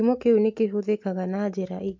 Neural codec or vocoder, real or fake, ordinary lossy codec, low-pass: none; real; MP3, 48 kbps; 7.2 kHz